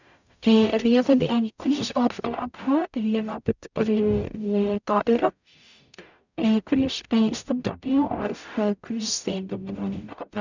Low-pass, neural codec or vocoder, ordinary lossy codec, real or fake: 7.2 kHz; codec, 44.1 kHz, 0.9 kbps, DAC; none; fake